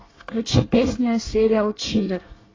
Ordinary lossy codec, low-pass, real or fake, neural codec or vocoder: AAC, 32 kbps; 7.2 kHz; fake; codec, 24 kHz, 1 kbps, SNAC